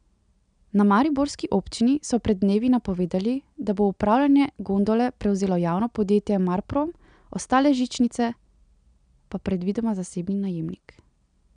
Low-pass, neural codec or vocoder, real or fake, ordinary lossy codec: 9.9 kHz; none; real; none